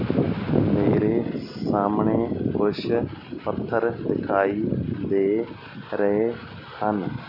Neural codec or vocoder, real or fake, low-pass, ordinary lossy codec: none; real; 5.4 kHz; none